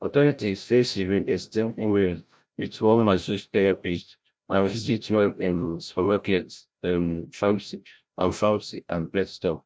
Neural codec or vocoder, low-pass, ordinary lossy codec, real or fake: codec, 16 kHz, 0.5 kbps, FreqCodec, larger model; none; none; fake